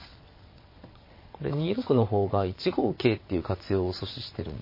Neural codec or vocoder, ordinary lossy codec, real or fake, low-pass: vocoder, 22.05 kHz, 80 mel bands, WaveNeXt; MP3, 24 kbps; fake; 5.4 kHz